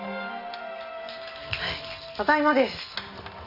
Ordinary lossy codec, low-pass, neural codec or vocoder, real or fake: none; 5.4 kHz; none; real